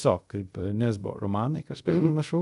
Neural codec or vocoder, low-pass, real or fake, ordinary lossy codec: codec, 24 kHz, 0.5 kbps, DualCodec; 10.8 kHz; fake; MP3, 96 kbps